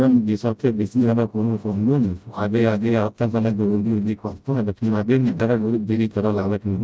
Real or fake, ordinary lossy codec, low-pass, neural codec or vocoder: fake; none; none; codec, 16 kHz, 0.5 kbps, FreqCodec, smaller model